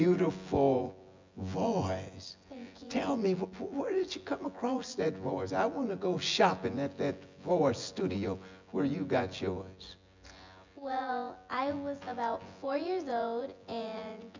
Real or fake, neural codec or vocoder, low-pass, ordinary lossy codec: fake; vocoder, 24 kHz, 100 mel bands, Vocos; 7.2 kHz; MP3, 64 kbps